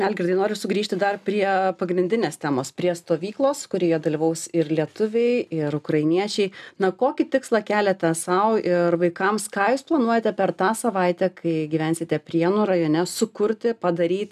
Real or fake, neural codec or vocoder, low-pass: real; none; 14.4 kHz